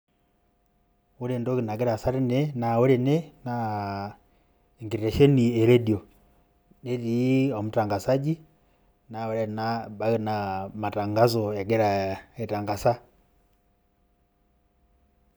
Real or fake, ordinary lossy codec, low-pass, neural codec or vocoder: real; none; none; none